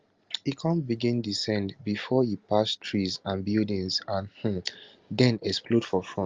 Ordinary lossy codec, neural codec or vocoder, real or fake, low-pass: Opus, 32 kbps; none; real; 7.2 kHz